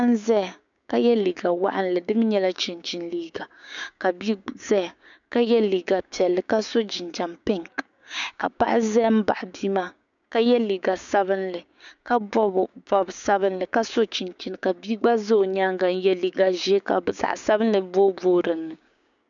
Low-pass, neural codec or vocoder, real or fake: 7.2 kHz; codec, 16 kHz, 6 kbps, DAC; fake